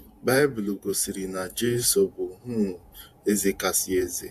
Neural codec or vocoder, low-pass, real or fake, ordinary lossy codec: vocoder, 48 kHz, 128 mel bands, Vocos; 14.4 kHz; fake; AAC, 96 kbps